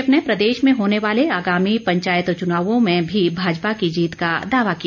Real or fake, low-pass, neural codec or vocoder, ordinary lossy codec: real; 7.2 kHz; none; none